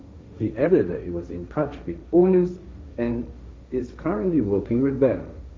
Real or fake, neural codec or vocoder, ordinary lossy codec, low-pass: fake; codec, 16 kHz, 1.1 kbps, Voila-Tokenizer; none; none